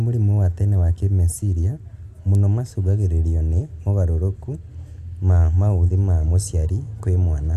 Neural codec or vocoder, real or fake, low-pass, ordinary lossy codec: none; real; 14.4 kHz; none